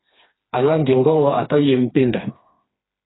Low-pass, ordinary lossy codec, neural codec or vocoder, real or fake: 7.2 kHz; AAC, 16 kbps; codec, 16 kHz, 2 kbps, FreqCodec, smaller model; fake